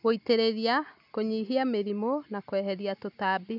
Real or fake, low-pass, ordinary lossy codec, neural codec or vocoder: real; 5.4 kHz; none; none